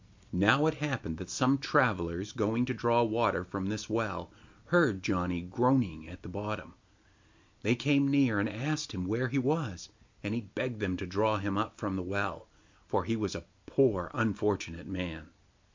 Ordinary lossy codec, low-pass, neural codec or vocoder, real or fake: MP3, 64 kbps; 7.2 kHz; none; real